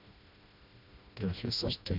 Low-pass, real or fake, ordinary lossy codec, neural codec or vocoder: 5.4 kHz; fake; MP3, 48 kbps; codec, 16 kHz, 1 kbps, FreqCodec, smaller model